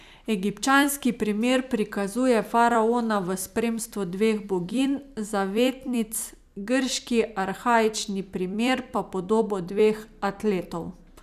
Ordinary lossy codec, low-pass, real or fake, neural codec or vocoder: none; 14.4 kHz; fake; vocoder, 44.1 kHz, 128 mel bands every 256 samples, BigVGAN v2